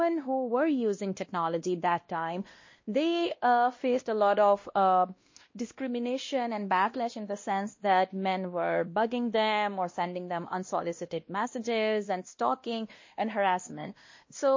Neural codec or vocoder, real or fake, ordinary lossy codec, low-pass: codec, 16 kHz, 1 kbps, X-Codec, WavLM features, trained on Multilingual LibriSpeech; fake; MP3, 32 kbps; 7.2 kHz